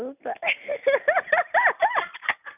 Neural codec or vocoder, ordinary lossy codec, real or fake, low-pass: none; none; real; 3.6 kHz